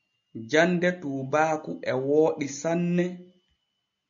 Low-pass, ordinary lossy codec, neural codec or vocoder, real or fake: 7.2 kHz; MP3, 48 kbps; none; real